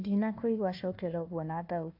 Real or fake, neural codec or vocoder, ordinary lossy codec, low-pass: fake; codec, 16 kHz, 1 kbps, FunCodec, trained on Chinese and English, 50 frames a second; AAC, 48 kbps; 5.4 kHz